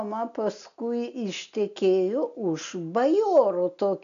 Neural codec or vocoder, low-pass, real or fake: none; 7.2 kHz; real